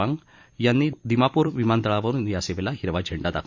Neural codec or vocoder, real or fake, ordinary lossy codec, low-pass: vocoder, 44.1 kHz, 128 mel bands every 256 samples, BigVGAN v2; fake; Opus, 64 kbps; 7.2 kHz